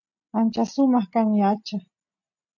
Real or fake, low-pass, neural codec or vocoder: real; 7.2 kHz; none